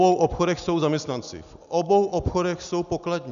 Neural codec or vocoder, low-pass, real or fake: none; 7.2 kHz; real